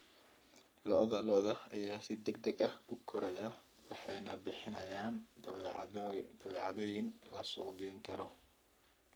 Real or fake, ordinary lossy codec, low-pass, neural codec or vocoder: fake; none; none; codec, 44.1 kHz, 3.4 kbps, Pupu-Codec